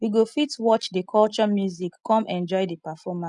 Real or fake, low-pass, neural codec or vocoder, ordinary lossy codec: real; 10.8 kHz; none; none